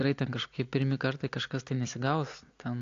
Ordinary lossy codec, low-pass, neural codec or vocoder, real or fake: AAC, 48 kbps; 7.2 kHz; none; real